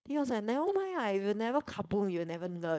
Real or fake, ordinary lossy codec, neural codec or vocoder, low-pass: fake; none; codec, 16 kHz, 4.8 kbps, FACodec; none